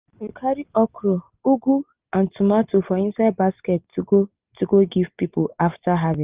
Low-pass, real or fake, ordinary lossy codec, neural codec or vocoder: 3.6 kHz; real; Opus, 24 kbps; none